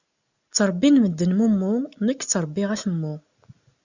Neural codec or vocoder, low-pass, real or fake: none; 7.2 kHz; real